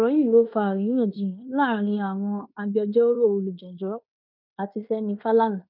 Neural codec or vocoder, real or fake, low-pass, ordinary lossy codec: codec, 16 kHz in and 24 kHz out, 0.9 kbps, LongCat-Audio-Codec, fine tuned four codebook decoder; fake; 5.4 kHz; none